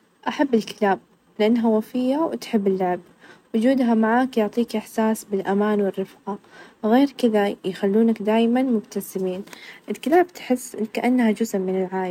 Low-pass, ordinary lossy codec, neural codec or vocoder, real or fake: 19.8 kHz; none; none; real